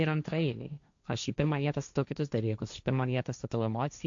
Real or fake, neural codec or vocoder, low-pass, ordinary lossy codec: fake; codec, 16 kHz, 1.1 kbps, Voila-Tokenizer; 7.2 kHz; AAC, 64 kbps